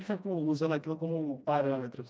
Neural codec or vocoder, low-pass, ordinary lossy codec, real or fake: codec, 16 kHz, 1 kbps, FreqCodec, smaller model; none; none; fake